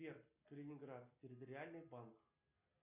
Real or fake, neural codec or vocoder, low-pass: real; none; 3.6 kHz